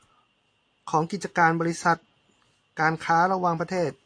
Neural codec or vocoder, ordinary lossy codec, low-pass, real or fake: none; AAC, 48 kbps; 9.9 kHz; real